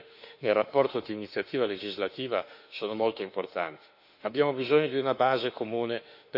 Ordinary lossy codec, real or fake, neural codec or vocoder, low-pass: none; fake; autoencoder, 48 kHz, 32 numbers a frame, DAC-VAE, trained on Japanese speech; 5.4 kHz